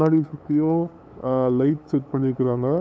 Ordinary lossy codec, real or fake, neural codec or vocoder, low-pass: none; fake; codec, 16 kHz, 8 kbps, FunCodec, trained on LibriTTS, 25 frames a second; none